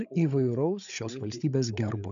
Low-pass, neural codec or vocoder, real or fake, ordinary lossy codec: 7.2 kHz; codec, 16 kHz, 8 kbps, FreqCodec, larger model; fake; MP3, 96 kbps